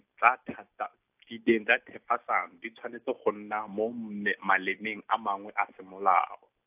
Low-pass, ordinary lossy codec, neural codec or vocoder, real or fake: 3.6 kHz; AAC, 32 kbps; none; real